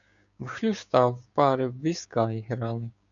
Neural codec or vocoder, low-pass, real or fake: codec, 16 kHz, 6 kbps, DAC; 7.2 kHz; fake